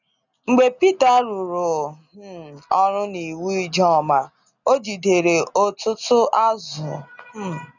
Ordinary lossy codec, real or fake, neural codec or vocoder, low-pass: none; real; none; 7.2 kHz